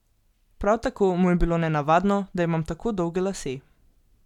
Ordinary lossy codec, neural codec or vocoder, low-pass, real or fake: none; none; 19.8 kHz; real